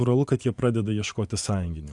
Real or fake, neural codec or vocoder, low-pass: fake; vocoder, 24 kHz, 100 mel bands, Vocos; 10.8 kHz